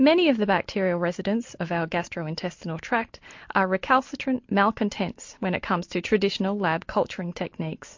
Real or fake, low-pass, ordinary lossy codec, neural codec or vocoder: real; 7.2 kHz; MP3, 48 kbps; none